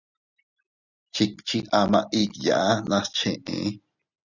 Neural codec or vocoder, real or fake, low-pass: none; real; 7.2 kHz